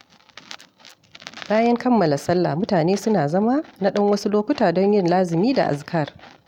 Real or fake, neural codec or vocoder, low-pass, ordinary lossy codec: real; none; 19.8 kHz; none